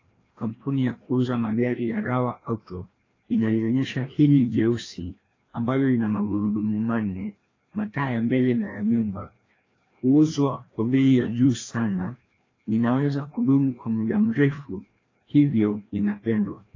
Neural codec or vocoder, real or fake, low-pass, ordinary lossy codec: codec, 16 kHz, 1 kbps, FreqCodec, larger model; fake; 7.2 kHz; AAC, 32 kbps